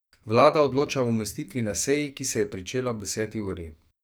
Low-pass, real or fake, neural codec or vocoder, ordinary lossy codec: none; fake; codec, 44.1 kHz, 2.6 kbps, SNAC; none